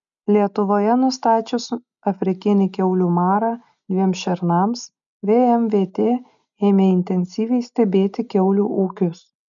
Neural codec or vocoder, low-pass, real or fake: none; 7.2 kHz; real